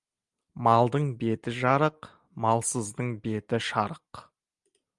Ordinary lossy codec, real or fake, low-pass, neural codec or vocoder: Opus, 32 kbps; real; 10.8 kHz; none